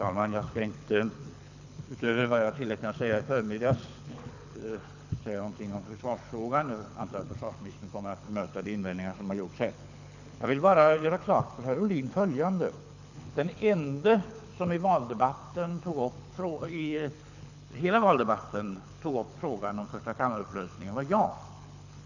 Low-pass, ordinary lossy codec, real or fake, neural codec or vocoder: 7.2 kHz; none; fake; codec, 24 kHz, 6 kbps, HILCodec